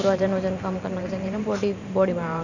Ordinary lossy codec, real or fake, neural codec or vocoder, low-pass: none; fake; vocoder, 44.1 kHz, 128 mel bands every 512 samples, BigVGAN v2; 7.2 kHz